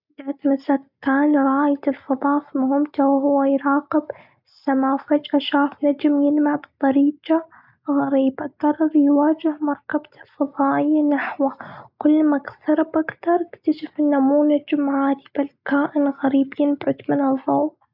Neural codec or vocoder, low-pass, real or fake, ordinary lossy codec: none; 5.4 kHz; real; none